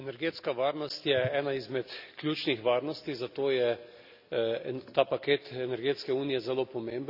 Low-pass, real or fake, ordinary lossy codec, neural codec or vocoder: 5.4 kHz; real; none; none